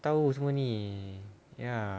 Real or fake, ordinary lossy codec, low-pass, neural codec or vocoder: real; none; none; none